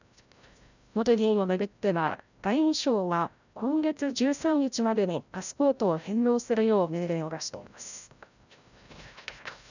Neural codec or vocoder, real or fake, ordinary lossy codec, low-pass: codec, 16 kHz, 0.5 kbps, FreqCodec, larger model; fake; none; 7.2 kHz